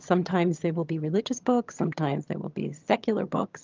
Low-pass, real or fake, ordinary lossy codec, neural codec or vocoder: 7.2 kHz; fake; Opus, 24 kbps; vocoder, 22.05 kHz, 80 mel bands, HiFi-GAN